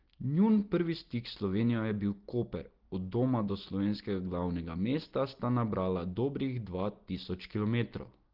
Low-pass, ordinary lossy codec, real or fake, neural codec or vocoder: 5.4 kHz; Opus, 16 kbps; real; none